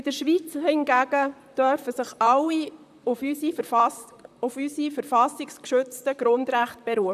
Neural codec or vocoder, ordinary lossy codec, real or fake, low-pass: vocoder, 44.1 kHz, 128 mel bands, Pupu-Vocoder; none; fake; 14.4 kHz